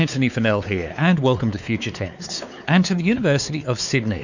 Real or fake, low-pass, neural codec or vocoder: fake; 7.2 kHz; codec, 16 kHz, 4 kbps, X-Codec, WavLM features, trained on Multilingual LibriSpeech